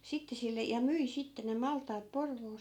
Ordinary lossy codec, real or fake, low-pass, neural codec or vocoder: none; real; 19.8 kHz; none